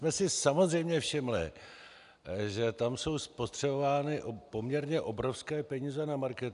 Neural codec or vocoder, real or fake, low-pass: none; real; 10.8 kHz